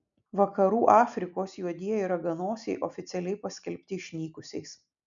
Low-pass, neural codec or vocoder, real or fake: 7.2 kHz; none; real